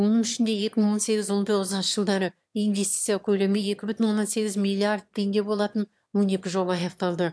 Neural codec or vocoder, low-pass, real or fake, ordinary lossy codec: autoencoder, 22.05 kHz, a latent of 192 numbers a frame, VITS, trained on one speaker; none; fake; none